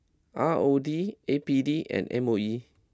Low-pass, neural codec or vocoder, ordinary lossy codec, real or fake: none; none; none; real